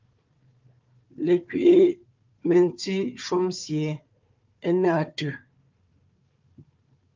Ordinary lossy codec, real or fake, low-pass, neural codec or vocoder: Opus, 32 kbps; fake; 7.2 kHz; codec, 16 kHz, 4 kbps, FunCodec, trained on Chinese and English, 50 frames a second